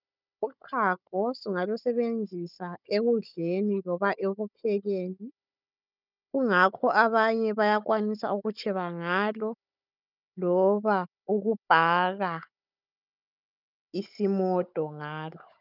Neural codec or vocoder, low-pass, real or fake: codec, 16 kHz, 16 kbps, FunCodec, trained on Chinese and English, 50 frames a second; 5.4 kHz; fake